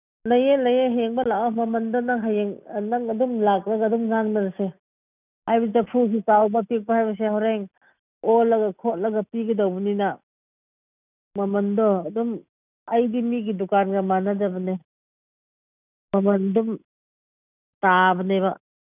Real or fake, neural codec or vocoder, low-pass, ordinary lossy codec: real; none; 3.6 kHz; none